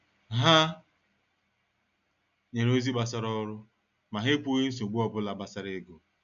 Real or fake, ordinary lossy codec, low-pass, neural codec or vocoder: real; none; 7.2 kHz; none